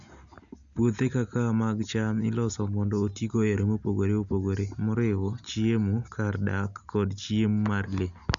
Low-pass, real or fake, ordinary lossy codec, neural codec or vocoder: 7.2 kHz; real; none; none